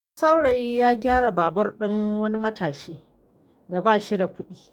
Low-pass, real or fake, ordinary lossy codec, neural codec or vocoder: 19.8 kHz; fake; none; codec, 44.1 kHz, 2.6 kbps, DAC